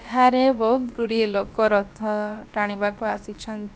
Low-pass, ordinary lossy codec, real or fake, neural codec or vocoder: none; none; fake; codec, 16 kHz, about 1 kbps, DyCAST, with the encoder's durations